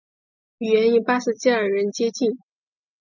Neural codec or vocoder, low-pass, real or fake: none; 7.2 kHz; real